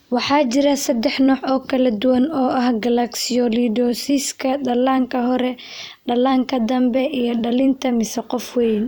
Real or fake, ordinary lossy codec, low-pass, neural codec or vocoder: fake; none; none; vocoder, 44.1 kHz, 128 mel bands every 256 samples, BigVGAN v2